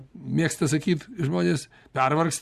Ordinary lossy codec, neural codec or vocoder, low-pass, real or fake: Opus, 64 kbps; none; 14.4 kHz; real